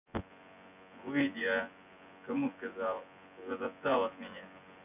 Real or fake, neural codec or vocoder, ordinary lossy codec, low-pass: fake; vocoder, 24 kHz, 100 mel bands, Vocos; none; 3.6 kHz